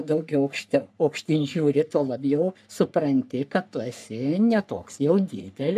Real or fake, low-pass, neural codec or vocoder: fake; 14.4 kHz; codec, 44.1 kHz, 3.4 kbps, Pupu-Codec